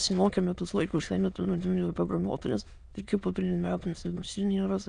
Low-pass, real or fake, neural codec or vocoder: 9.9 kHz; fake; autoencoder, 22.05 kHz, a latent of 192 numbers a frame, VITS, trained on many speakers